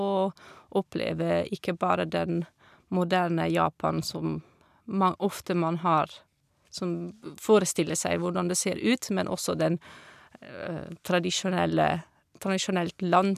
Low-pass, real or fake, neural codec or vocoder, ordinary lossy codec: 14.4 kHz; real; none; none